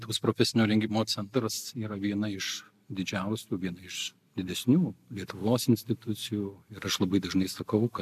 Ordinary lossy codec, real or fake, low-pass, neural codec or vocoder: AAC, 96 kbps; real; 14.4 kHz; none